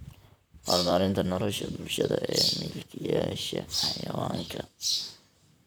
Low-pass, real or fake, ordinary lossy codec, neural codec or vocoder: none; fake; none; vocoder, 44.1 kHz, 128 mel bands, Pupu-Vocoder